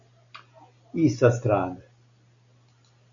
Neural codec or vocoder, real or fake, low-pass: none; real; 7.2 kHz